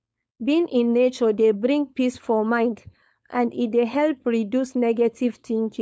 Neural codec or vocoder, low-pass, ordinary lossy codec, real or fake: codec, 16 kHz, 4.8 kbps, FACodec; none; none; fake